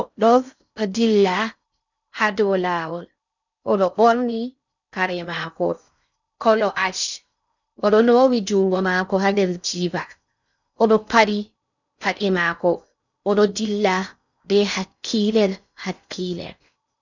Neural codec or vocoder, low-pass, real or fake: codec, 16 kHz in and 24 kHz out, 0.6 kbps, FocalCodec, streaming, 4096 codes; 7.2 kHz; fake